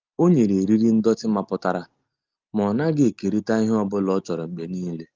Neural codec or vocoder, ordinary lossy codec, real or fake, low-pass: none; Opus, 24 kbps; real; 7.2 kHz